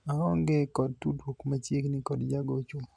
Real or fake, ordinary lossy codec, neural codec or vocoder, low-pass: real; none; none; 9.9 kHz